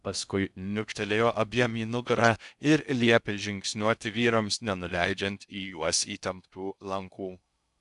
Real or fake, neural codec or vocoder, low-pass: fake; codec, 16 kHz in and 24 kHz out, 0.6 kbps, FocalCodec, streaming, 2048 codes; 10.8 kHz